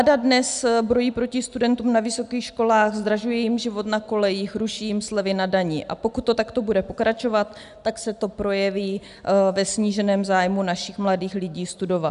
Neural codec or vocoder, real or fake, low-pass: none; real; 10.8 kHz